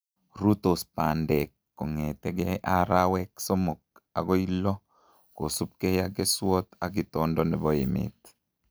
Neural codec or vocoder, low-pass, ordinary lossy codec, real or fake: none; none; none; real